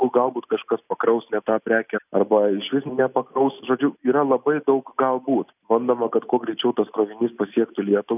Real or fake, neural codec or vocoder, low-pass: real; none; 3.6 kHz